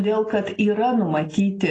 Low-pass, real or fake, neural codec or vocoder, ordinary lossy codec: 9.9 kHz; real; none; AAC, 32 kbps